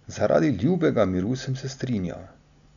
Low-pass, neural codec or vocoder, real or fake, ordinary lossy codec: 7.2 kHz; none; real; none